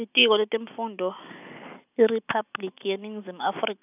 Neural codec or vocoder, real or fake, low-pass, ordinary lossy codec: none; real; 3.6 kHz; none